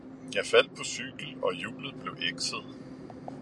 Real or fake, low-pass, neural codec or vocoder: real; 10.8 kHz; none